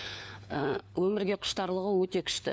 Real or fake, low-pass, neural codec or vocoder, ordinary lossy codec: fake; none; codec, 16 kHz, 4 kbps, FunCodec, trained on LibriTTS, 50 frames a second; none